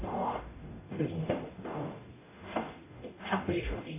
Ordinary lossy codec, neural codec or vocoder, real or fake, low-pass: AAC, 16 kbps; codec, 44.1 kHz, 0.9 kbps, DAC; fake; 3.6 kHz